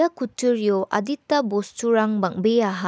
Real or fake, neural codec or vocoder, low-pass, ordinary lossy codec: real; none; none; none